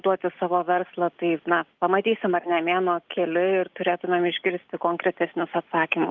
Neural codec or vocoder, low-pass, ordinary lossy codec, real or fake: none; 7.2 kHz; Opus, 32 kbps; real